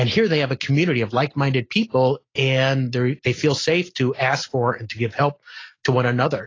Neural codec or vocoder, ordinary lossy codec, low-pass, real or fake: none; AAC, 32 kbps; 7.2 kHz; real